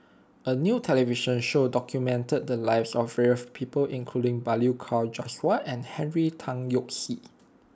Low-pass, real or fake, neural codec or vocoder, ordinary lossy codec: none; real; none; none